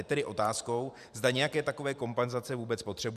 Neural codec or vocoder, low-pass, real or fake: vocoder, 44.1 kHz, 128 mel bands every 512 samples, BigVGAN v2; 14.4 kHz; fake